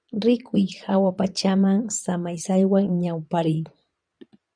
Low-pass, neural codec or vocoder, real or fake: 9.9 kHz; vocoder, 22.05 kHz, 80 mel bands, Vocos; fake